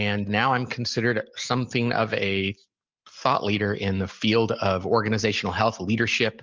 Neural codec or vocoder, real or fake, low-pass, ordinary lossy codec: none; real; 7.2 kHz; Opus, 32 kbps